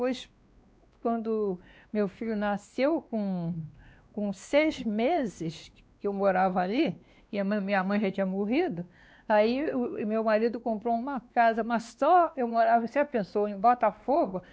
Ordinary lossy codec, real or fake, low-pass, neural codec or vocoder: none; fake; none; codec, 16 kHz, 2 kbps, X-Codec, WavLM features, trained on Multilingual LibriSpeech